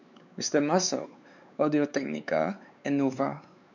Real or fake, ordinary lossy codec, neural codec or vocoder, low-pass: fake; none; codec, 16 kHz, 4 kbps, X-Codec, WavLM features, trained on Multilingual LibriSpeech; 7.2 kHz